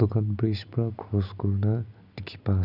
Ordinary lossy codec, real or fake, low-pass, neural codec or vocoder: none; real; 5.4 kHz; none